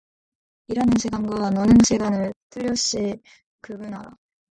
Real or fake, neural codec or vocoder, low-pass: real; none; 9.9 kHz